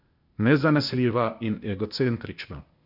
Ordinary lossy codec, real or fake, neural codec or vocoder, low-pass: none; fake; codec, 16 kHz, 0.8 kbps, ZipCodec; 5.4 kHz